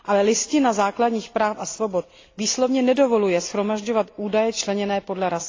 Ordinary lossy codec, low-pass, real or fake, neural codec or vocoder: AAC, 32 kbps; 7.2 kHz; real; none